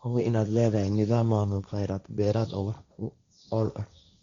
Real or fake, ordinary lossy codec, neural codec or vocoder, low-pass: fake; Opus, 64 kbps; codec, 16 kHz, 1.1 kbps, Voila-Tokenizer; 7.2 kHz